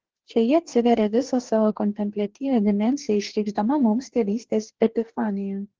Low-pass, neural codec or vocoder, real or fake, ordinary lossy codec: 7.2 kHz; codec, 44.1 kHz, 2.6 kbps, DAC; fake; Opus, 16 kbps